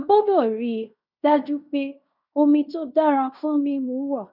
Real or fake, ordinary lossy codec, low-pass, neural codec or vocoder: fake; none; 5.4 kHz; codec, 16 kHz in and 24 kHz out, 0.9 kbps, LongCat-Audio-Codec, fine tuned four codebook decoder